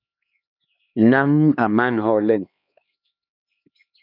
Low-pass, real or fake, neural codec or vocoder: 5.4 kHz; fake; codec, 16 kHz, 2 kbps, X-Codec, HuBERT features, trained on LibriSpeech